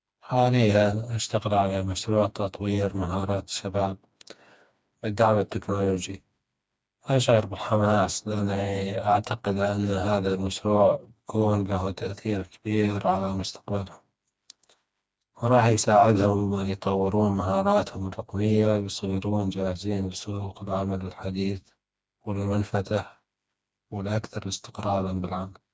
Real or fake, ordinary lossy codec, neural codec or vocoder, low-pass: fake; none; codec, 16 kHz, 2 kbps, FreqCodec, smaller model; none